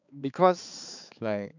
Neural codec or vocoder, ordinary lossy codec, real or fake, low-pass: codec, 16 kHz, 4 kbps, X-Codec, HuBERT features, trained on balanced general audio; none; fake; 7.2 kHz